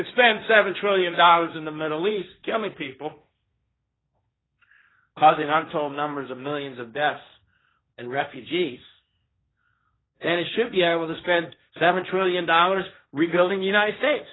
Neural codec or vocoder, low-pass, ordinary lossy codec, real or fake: codec, 16 kHz, 1.1 kbps, Voila-Tokenizer; 7.2 kHz; AAC, 16 kbps; fake